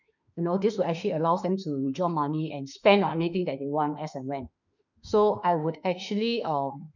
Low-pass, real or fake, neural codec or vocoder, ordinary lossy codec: 7.2 kHz; fake; autoencoder, 48 kHz, 32 numbers a frame, DAC-VAE, trained on Japanese speech; none